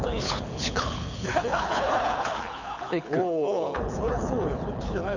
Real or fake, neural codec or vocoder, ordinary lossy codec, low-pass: fake; codec, 24 kHz, 6 kbps, HILCodec; none; 7.2 kHz